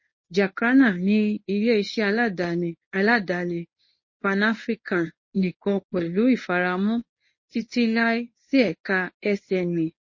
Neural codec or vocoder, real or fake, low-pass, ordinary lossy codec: codec, 24 kHz, 0.9 kbps, WavTokenizer, medium speech release version 1; fake; 7.2 kHz; MP3, 32 kbps